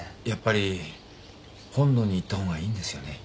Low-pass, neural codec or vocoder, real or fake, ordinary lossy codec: none; none; real; none